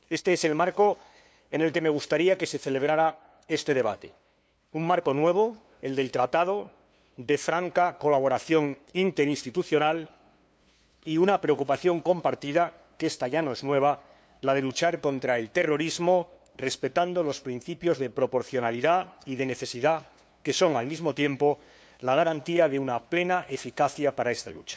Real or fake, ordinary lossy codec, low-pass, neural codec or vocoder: fake; none; none; codec, 16 kHz, 2 kbps, FunCodec, trained on LibriTTS, 25 frames a second